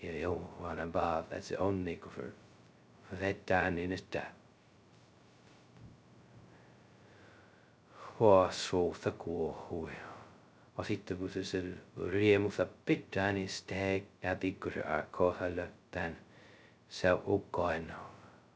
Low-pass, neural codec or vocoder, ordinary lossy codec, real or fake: none; codec, 16 kHz, 0.2 kbps, FocalCodec; none; fake